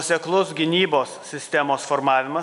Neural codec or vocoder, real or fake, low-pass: none; real; 10.8 kHz